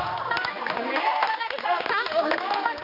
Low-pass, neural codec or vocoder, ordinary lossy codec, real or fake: 5.4 kHz; codec, 16 kHz, 2 kbps, X-Codec, HuBERT features, trained on general audio; none; fake